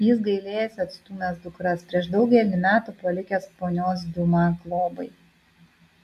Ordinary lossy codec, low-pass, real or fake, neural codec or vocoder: AAC, 96 kbps; 14.4 kHz; real; none